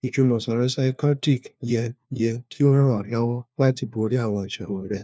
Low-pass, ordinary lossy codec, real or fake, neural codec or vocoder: none; none; fake; codec, 16 kHz, 1 kbps, FunCodec, trained on LibriTTS, 50 frames a second